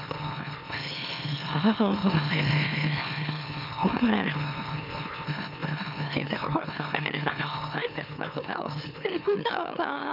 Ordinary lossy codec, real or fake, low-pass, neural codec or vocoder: none; fake; 5.4 kHz; autoencoder, 44.1 kHz, a latent of 192 numbers a frame, MeloTTS